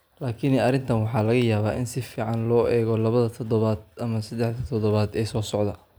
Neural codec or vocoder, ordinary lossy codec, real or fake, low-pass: none; none; real; none